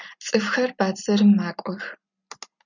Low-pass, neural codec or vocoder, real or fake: 7.2 kHz; none; real